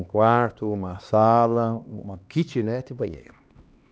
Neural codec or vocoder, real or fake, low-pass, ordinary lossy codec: codec, 16 kHz, 2 kbps, X-Codec, HuBERT features, trained on LibriSpeech; fake; none; none